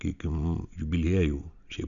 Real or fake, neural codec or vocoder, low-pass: real; none; 7.2 kHz